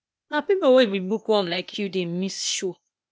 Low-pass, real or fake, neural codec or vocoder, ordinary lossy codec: none; fake; codec, 16 kHz, 0.8 kbps, ZipCodec; none